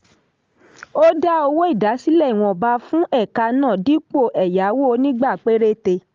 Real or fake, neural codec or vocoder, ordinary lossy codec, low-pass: real; none; Opus, 32 kbps; 7.2 kHz